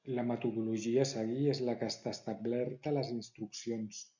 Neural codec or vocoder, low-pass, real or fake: none; 7.2 kHz; real